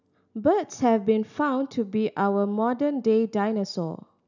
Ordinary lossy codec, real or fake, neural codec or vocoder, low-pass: none; real; none; 7.2 kHz